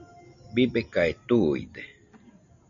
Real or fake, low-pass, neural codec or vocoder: real; 7.2 kHz; none